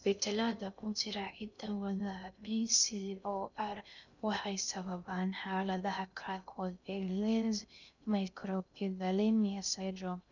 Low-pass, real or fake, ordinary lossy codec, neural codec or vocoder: 7.2 kHz; fake; none; codec, 16 kHz in and 24 kHz out, 0.6 kbps, FocalCodec, streaming, 2048 codes